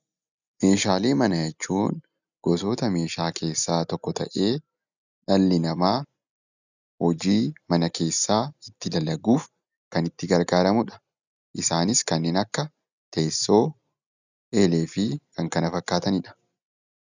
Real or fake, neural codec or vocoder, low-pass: real; none; 7.2 kHz